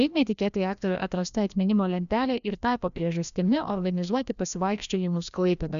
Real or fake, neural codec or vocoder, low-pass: fake; codec, 16 kHz, 1 kbps, FreqCodec, larger model; 7.2 kHz